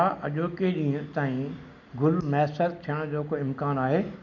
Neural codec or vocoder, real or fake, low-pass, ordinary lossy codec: none; real; 7.2 kHz; none